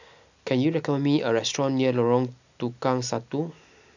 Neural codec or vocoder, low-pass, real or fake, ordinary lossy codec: none; 7.2 kHz; real; none